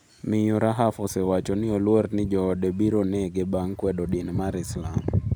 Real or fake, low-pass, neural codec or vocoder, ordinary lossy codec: real; none; none; none